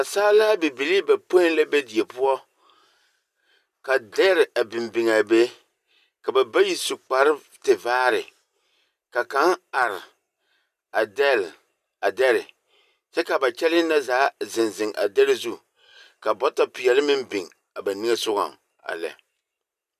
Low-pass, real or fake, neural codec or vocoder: 14.4 kHz; real; none